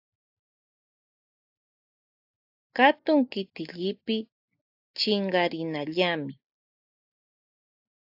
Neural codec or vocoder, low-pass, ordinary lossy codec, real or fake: none; 5.4 kHz; AAC, 48 kbps; real